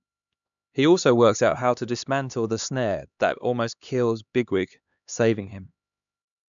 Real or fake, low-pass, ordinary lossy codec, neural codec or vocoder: fake; 7.2 kHz; none; codec, 16 kHz, 2 kbps, X-Codec, HuBERT features, trained on LibriSpeech